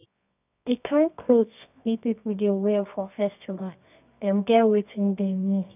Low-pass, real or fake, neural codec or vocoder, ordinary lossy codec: 3.6 kHz; fake; codec, 24 kHz, 0.9 kbps, WavTokenizer, medium music audio release; none